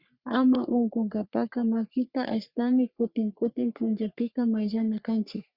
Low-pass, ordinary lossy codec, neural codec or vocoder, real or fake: 5.4 kHz; Opus, 64 kbps; codec, 44.1 kHz, 3.4 kbps, Pupu-Codec; fake